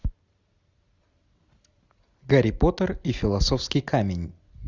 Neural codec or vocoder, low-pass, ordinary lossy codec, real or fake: none; 7.2 kHz; Opus, 64 kbps; real